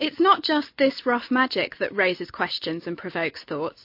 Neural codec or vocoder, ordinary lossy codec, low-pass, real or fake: none; MP3, 32 kbps; 5.4 kHz; real